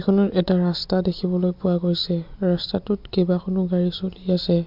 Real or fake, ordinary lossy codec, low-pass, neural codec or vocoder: real; MP3, 48 kbps; 5.4 kHz; none